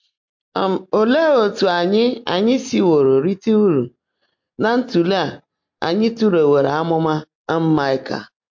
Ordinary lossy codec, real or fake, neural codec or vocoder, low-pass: MP3, 48 kbps; real; none; 7.2 kHz